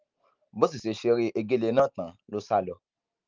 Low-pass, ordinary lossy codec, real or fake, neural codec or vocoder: 7.2 kHz; Opus, 24 kbps; real; none